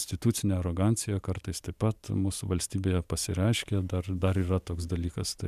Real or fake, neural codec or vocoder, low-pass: fake; vocoder, 44.1 kHz, 128 mel bands every 512 samples, BigVGAN v2; 14.4 kHz